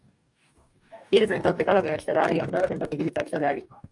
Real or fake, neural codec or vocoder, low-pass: fake; codec, 44.1 kHz, 2.6 kbps, DAC; 10.8 kHz